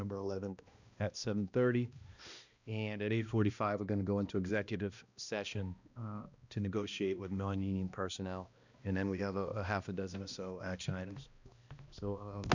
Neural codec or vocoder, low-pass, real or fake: codec, 16 kHz, 1 kbps, X-Codec, HuBERT features, trained on balanced general audio; 7.2 kHz; fake